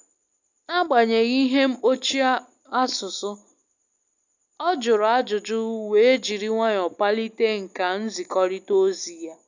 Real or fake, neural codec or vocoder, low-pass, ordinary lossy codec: real; none; 7.2 kHz; none